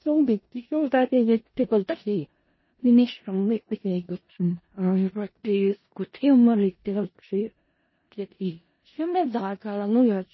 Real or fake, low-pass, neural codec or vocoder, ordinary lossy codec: fake; 7.2 kHz; codec, 16 kHz in and 24 kHz out, 0.4 kbps, LongCat-Audio-Codec, four codebook decoder; MP3, 24 kbps